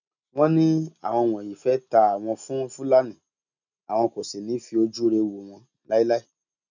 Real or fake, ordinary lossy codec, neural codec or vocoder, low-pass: real; none; none; 7.2 kHz